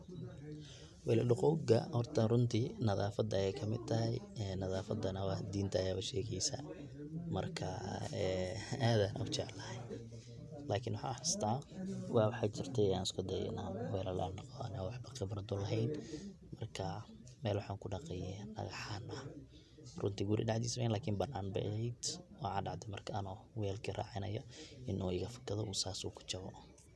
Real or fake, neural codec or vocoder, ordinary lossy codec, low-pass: real; none; none; none